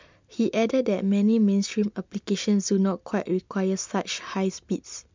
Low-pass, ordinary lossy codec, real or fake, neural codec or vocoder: 7.2 kHz; MP3, 64 kbps; real; none